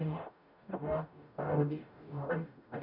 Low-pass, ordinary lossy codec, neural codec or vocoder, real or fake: 5.4 kHz; none; codec, 44.1 kHz, 0.9 kbps, DAC; fake